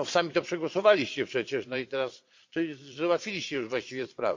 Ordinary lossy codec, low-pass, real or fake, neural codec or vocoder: MP3, 48 kbps; 7.2 kHz; fake; vocoder, 22.05 kHz, 80 mel bands, Vocos